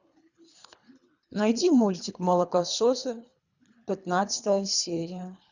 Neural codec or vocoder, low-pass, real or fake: codec, 24 kHz, 3 kbps, HILCodec; 7.2 kHz; fake